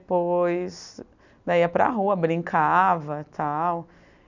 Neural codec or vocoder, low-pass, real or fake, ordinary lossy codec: none; 7.2 kHz; real; none